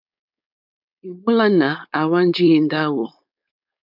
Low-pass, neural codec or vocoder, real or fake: 5.4 kHz; codec, 16 kHz, 4.8 kbps, FACodec; fake